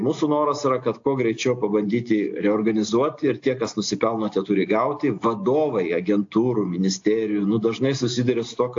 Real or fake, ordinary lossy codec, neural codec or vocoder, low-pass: real; AAC, 48 kbps; none; 7.2 kHz